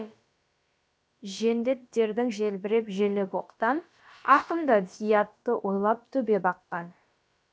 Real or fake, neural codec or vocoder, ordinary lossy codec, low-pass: fake; codec, 16 kHz, about 1 kbps, DyCAST, with the encoder's durations; none; none